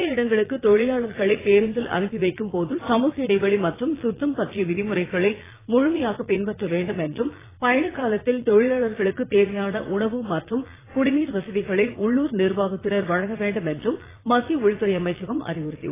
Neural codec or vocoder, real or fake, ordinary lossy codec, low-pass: vocoder, 22.05 kHz, 80 mel bands, Vocos; fake; AAC, 16 kbps; 3.6 kHz